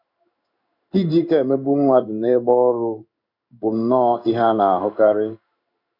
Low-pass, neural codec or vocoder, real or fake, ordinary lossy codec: 5.4 kHz; codec, 16 kHz in and 24 kHz out, 1 kbps, XY-Tokenizer; fake; none